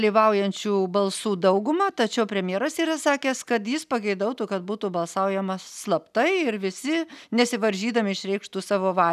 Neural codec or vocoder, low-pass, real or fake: none; 14.4 kHz; real